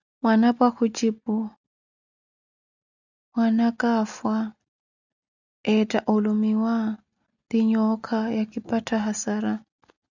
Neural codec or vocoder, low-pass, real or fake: none; 7.2 kHz; real